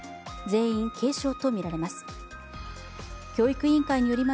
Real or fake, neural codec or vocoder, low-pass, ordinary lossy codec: real; none; none; none